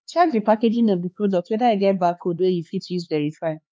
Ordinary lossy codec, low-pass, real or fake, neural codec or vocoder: none; none; fake; codec, 16 kHz, 4 kbps, X-Codec, HuBERT features, trained on LibriSpeech